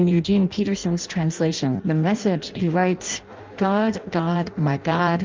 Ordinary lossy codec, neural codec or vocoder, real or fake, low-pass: Opus, 24 kbps; codec, 16 kHz in and 24 kHz out, 0.6 kbps, FireRedTTS-2 codec; fake; 7.2 kHz